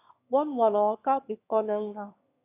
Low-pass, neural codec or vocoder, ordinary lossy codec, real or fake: 3.6 kHz; autoencoder, 22.05 kHz, a latent of 192 numbers a frame, VITS, trained on one speaker; AAC, 24 kbps; fake